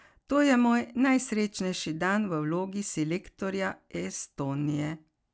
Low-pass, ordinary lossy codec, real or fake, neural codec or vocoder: none; none; real; none